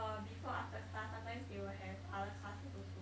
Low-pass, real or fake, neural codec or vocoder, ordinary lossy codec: none; real; none; none